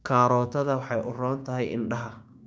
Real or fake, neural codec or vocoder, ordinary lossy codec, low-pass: fake; codec, 16 kHz, 6 kbps, DAC; none; none